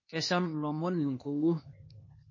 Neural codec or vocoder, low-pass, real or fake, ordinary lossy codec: codec, 16 kHz, 0.8 kbps, ZipCodec; 7.2 kHz; fake; MP3, 32 kbps